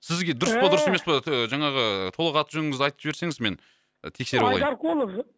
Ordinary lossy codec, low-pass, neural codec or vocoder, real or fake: none; none; none; real